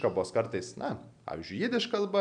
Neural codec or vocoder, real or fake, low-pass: none; real; 9.9 kHz